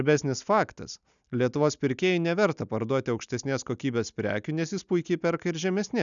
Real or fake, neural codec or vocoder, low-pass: real; none; 7.2 kHz